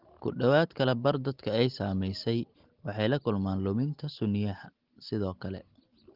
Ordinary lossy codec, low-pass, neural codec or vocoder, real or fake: Opus, 16 kbps; 5.4 kHz; none; real